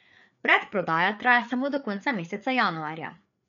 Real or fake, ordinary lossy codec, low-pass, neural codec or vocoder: fake; none; 7.2 kHz; codec, 16 kHz, 4 kbps, FreqCodec, larger model